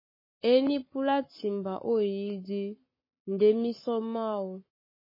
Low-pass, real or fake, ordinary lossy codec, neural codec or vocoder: 5.4 kHz; fake; MP3, 24 kbps; codec, 44.1 kHz, 7.8 kbps, Pupu-Codec